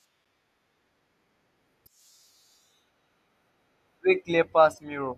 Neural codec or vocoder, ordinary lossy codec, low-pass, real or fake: none; none; 14.4 kHz; real